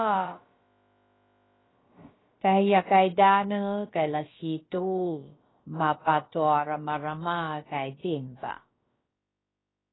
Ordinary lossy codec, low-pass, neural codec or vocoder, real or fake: AAC, 16 kbps; 7.2 kHz; codec, 16 kHz, about 1 kbps, DyCAST, with the encoder's durations; fake